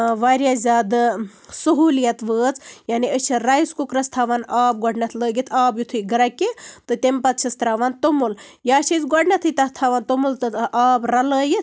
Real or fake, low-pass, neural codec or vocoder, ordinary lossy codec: real; none; none; none